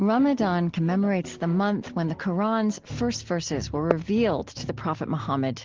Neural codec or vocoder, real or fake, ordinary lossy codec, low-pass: none; real; Opus, 16 kbps; 7.2 kHz